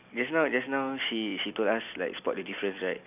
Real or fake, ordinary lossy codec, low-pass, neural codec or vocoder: real; none; 3.6 kHz; none